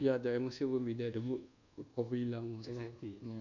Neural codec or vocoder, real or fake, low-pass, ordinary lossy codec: codec, 24 kHz, 1.2 kbps, DualCodec; fake; 7.2 kHz; none